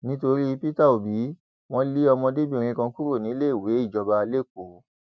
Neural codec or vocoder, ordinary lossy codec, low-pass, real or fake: none; none; none; real